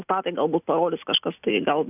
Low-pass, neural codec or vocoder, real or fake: 3.6 kHz; none; real